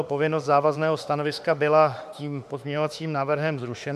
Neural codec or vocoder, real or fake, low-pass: autoencoder, 48 kHz, 32 numbers a frame, DAC-VAE, trained on Japanese speech; fake; 14.4 kHz